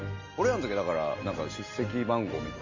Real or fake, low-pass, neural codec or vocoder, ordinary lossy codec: real; 7.2 kHz; none; Opus, 32 kbps